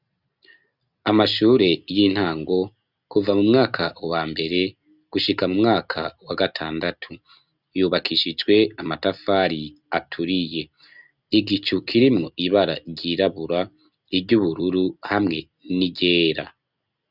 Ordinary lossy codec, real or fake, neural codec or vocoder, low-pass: Opus, 64 kbps; real; none; 5.4 kHz